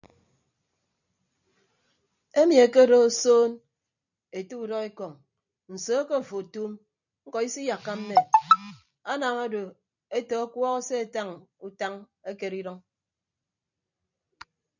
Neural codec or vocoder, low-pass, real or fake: vocoder, 44.1 kHz, 128 mel bands every 256 samples, BigVGAN v2; 7.2 kHz; fake